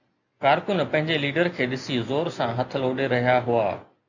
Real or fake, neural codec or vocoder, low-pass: real; none; 7.2 kHz